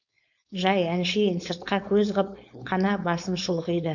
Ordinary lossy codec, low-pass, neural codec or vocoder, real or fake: none; 7.2 kHz; codec, 16 kHz, 4.8 kbps, FACodec; fake